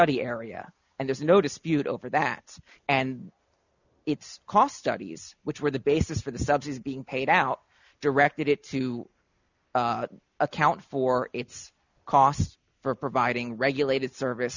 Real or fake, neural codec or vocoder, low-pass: real; none; 7.2 kHz